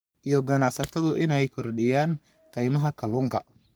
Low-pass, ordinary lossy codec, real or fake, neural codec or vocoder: none; none; fake; codec, 44.1 kHz, 3.4 kbps, Pupu-Codec